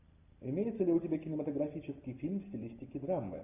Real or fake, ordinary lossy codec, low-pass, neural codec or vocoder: real; AAC, 24 kbps; 3.6 kHz; none